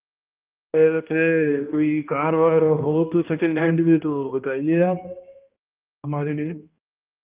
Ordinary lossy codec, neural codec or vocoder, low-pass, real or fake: Opus, 24 kbps; codec, 16 kHz, 1 kbps, X-Codec, HuBERT features, trained on balanced general audio; 3.6 kHz; fake